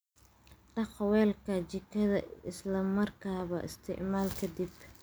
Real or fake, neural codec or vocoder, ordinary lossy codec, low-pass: real; none; none; none